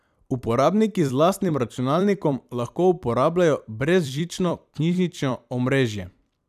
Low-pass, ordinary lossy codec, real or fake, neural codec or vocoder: 14.4 kHz; none; fake; vocoder, 44.1 kHz, 128 mel bands every 256 samples, BigVGAN v2